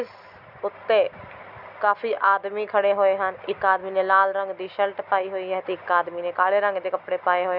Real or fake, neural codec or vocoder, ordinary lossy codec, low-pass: real; none; none; 5.4 kHz